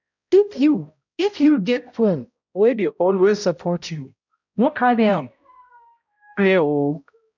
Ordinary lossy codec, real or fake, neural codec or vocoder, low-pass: none; fake; codec, 16 kHz, 0.5 kbps, X-Codec, HuBERT features, trained on balanced general audio; 7.2 kHz